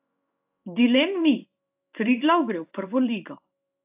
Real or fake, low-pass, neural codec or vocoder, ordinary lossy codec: fake; 3.6 kHz; codec, 16 kHz in and 24 kHz out, 1 kbps, XY-Tokenizer; AAC, 32 kbps